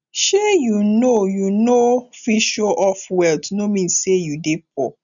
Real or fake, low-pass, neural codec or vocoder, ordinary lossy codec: real; 7.2 kHz; none; none